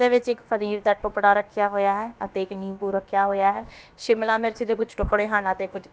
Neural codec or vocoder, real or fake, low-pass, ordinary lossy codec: codec, 16 kHz, about 1 kbps, DyCAST, with the encoder's durations; fake; none; none